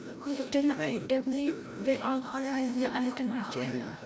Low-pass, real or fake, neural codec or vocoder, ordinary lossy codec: none; fake; codec, 16 kHz, 0.5 kbps, FreqCodec, larger model; none